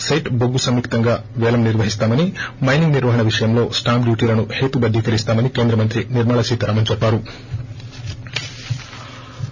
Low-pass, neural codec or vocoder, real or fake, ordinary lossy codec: 7.2 kHz; none; real; none